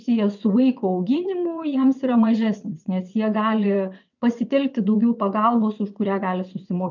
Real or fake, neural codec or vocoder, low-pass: fake; vocoder, 22.05 kHz, 80 mel bands, WaveNeXt; 7.2 kHz